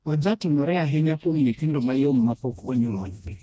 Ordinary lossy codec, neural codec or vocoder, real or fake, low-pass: none; codec, 16 kHz, 1 kbps, FreqCodec, smaller model; fake; none